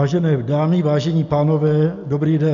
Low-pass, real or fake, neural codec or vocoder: 7.2 kHz; real; none